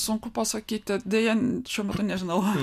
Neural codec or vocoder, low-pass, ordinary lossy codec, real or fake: none; 14.4 kHz; MP3, 96 kbps; real